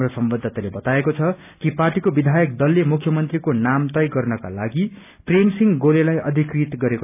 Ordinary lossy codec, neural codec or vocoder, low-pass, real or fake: none; none; 3.6 kHz; real